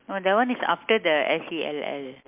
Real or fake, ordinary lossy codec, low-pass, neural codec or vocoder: real; MP3, 32 kbps; 3.6 kHz; none